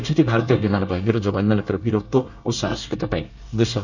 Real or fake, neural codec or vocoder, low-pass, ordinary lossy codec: fake; codec, 24 kHz, 1 kbps, SNAC; 7.2 kHz; none